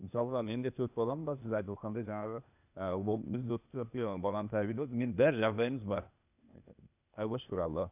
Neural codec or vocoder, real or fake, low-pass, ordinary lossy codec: codec, 16 kHz, 0.8 kbps, ZipCodec; fake; 3.6 kHz; none